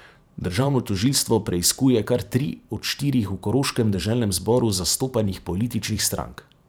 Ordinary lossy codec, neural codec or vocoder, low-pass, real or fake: none; vocoder, 44.1 kHz, 128 mel bands every 512 samples, BigVGAN v2; none; fake